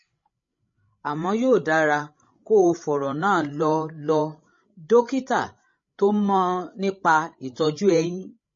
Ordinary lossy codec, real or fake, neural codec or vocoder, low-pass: MP3, 32 kbps; fake; codec, 16 kHz, 16 kbps, FreqCodec, larger model; 7.2 kHz